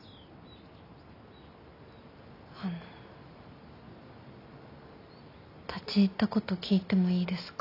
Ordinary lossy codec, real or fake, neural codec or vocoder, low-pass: AAC, 32 kbps; real; none; 5.4 kHz